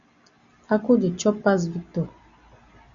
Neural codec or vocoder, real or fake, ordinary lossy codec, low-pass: none; real; Opus, 64 kbps; 7.2 kHz